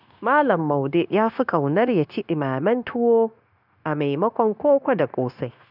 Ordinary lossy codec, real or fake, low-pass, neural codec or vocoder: none; fake; 5.4 kHz; codec, 16 kHz, 0.9 kbps, LongCat-Audio-Codec